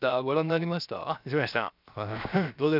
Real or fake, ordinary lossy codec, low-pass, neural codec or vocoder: fake; none; 5.4 kHz; codec, 16 kHz, 0.7 kbps, FocalCodec